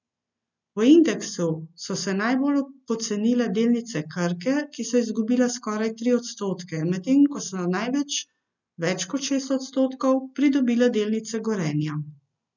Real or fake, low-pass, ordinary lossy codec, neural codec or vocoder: real; 7.2 kHz; none; none